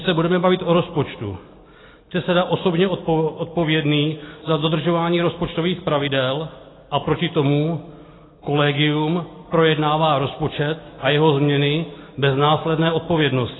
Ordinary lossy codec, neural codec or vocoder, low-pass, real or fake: AAC, 16 kbps; none; 7.2 kHz; real